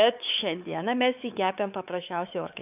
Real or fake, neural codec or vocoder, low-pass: fake; codec, 16 kHz, 4 kbps, X-Codec, WavLM features, trained on Multilingual LibriSpeech; 3.6 kHz